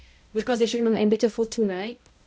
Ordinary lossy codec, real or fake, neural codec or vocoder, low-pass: none; fake; codec, 16 kHz, 0.5 kbps, X-Codec, HuBERT features, trained on balanced general audio; none